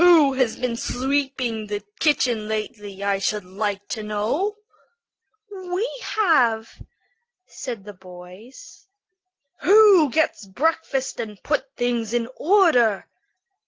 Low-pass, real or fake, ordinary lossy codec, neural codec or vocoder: 7.2 kHz; real; Opus, 16 kbps; none